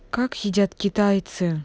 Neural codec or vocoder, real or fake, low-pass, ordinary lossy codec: none; real; none; none